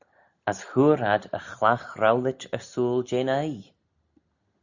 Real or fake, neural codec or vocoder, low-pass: real; none; 7.2 kHz